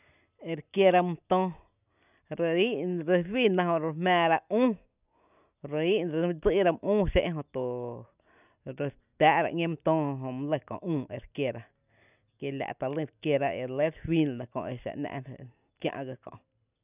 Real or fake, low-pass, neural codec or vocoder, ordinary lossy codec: real; 3.6 kHz; none; none